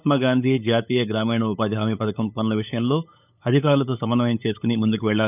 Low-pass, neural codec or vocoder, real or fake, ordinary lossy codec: 3.6 kHz; codec, 16 kHz, 8 kbps, FunCodec, trained on LibriTTS, 25 frames a second; fake; none